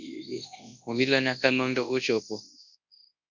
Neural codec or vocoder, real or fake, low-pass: codec, 24 kHz, 0.9 kbps, WavTokenizer, large speech release; fake; 7.2 kHz